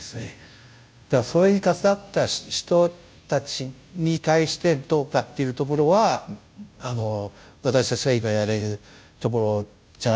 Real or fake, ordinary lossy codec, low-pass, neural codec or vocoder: fake; none; none; codec, 16 kHz, 0.5 kbps, FunCodec, trained on Chinese and English, 25 frames a second